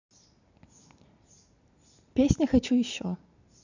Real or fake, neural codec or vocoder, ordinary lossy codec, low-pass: real; none; none; 7.2 kHz